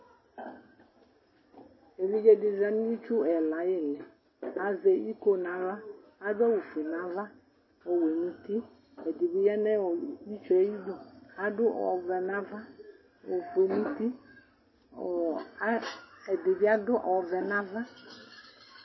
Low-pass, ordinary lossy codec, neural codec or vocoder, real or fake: 7.2 kHz; MP3, 24 kbps; none; real